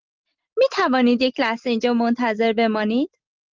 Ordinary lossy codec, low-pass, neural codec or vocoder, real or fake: Opus, 32 kbps; 7.2 kHz; none; real